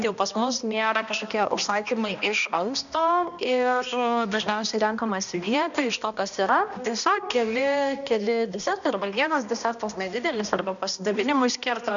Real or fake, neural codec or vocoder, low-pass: fake; codec, 16 kHz, 1 kbps, X-Codec, HuBERT features, trained on balanced general audio; 7.2 kHz